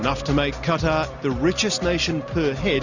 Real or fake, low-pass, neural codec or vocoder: real; 7.2 kHz; none